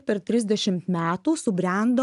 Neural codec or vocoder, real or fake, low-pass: none; real; 10.8 kHz